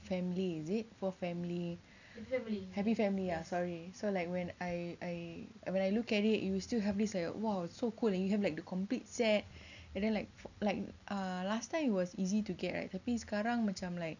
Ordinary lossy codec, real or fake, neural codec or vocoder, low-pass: none; real; none; 7.2 kHz